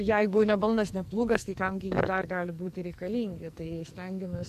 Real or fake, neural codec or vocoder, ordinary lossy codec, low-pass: fake; codec, 44.1 kHz, 2.6 kbps, SNAC; AAC, 96 kbps; 14.4 kHz